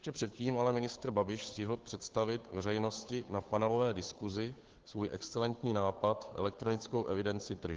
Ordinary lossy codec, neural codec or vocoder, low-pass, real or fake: Opus, 16 kbps; codec, 16 kHz, 2 kbps, FunCodec, trained on Chinese and English, 25 frames a second; 7.2 kHz; fake